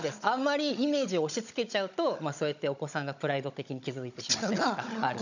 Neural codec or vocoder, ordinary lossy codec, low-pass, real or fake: codec, 16 kHz, 16 kbps, FunCodec, trained on Chinese and English, 50 frames a second; none; 7.2 kHz; fake